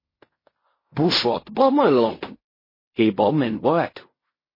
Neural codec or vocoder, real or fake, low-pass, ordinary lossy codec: codec, 16 kHz in and 24 kHz out, 0.4 kbps, LongCat-Audio-Codec, fine tuned four codebook decoder; fake; 5.4 kHz; MP3, 24 kbps